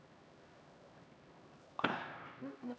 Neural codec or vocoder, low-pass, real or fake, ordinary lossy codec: codec, 16 kHz, 1 kbps, X-Codec, HuBERT features, trained on LibriSpeech; none; fake; none